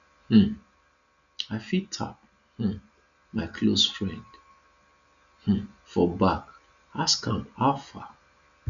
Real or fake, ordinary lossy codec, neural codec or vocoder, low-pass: real; none; none; 7.2 kHz